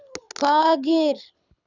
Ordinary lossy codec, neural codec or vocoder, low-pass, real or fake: none; codec, 24 kHz, 6 kbps, HILCodec; 7.2 kHz; fake